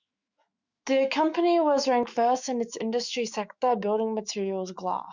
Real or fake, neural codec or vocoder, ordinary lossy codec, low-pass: fake; autoencoder, 48 kHz, 128 numbers a frame, DAC-VAE, trained on Japanese speech; Opus, 64 kbps; 7.2 kHz